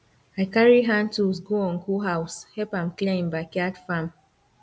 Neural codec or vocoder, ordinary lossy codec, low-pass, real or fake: none; none; none; real